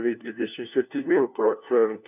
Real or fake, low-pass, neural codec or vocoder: fake; 3.6 kHz; codec, 16 kHz, 1 kbps, FunCodec, trained on LibriTTS, 50 frames a second